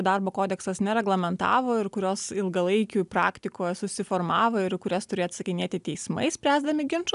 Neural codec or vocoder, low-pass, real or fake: none; 10.8 kHz; real